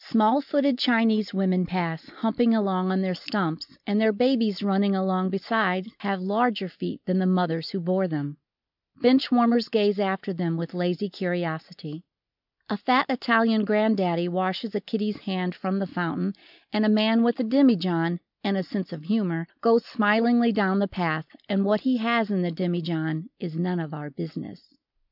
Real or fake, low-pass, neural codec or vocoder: real; 5.4 kHz; none